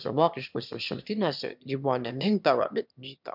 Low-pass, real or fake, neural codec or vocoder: 5.4 kHz; fake; autoencoder, 22.05 kHz, a latent of 192 numbers a frame, VITS, trained on one speaker